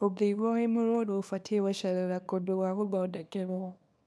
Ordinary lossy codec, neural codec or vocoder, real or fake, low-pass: none; codec, 24 kHz, 0.9 kbps, WavTokenizer, small release; fake; none